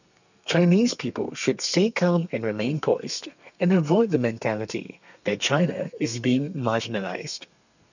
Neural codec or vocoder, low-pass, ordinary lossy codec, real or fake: codec, 32 kHz, 1.9 kbps, SNAC; 7.2 kHz; none; fake